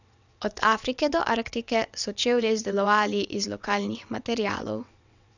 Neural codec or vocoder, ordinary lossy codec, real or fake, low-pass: vocoder, 22.05 kHz, 80 mel bands, WaveNeXt; none; fake; 7.2 kHz